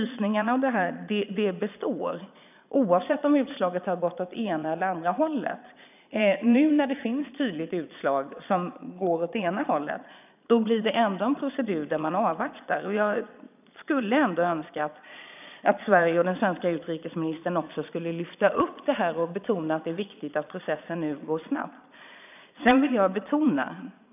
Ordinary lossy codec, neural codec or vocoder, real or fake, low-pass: none; vocoder, 22.05 kHz, 80 mel bands, Vocos; fake; 3.6 kHz